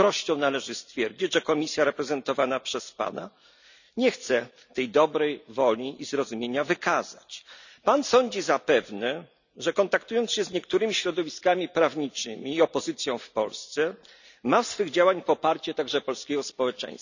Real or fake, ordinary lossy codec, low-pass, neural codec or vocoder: real; none; 7.2 kHz; none